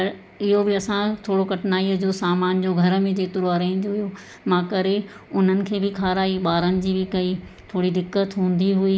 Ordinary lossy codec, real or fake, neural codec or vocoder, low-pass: none; real; none; none